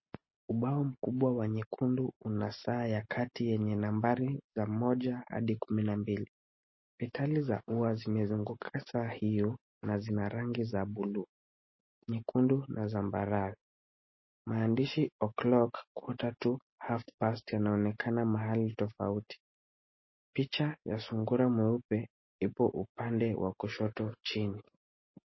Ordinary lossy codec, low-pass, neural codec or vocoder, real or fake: MP3, 24 kbps; 7.2 kHz; none; real